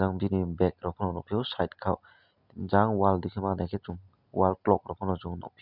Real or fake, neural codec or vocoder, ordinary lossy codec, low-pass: real; none; none; 5.4 kHz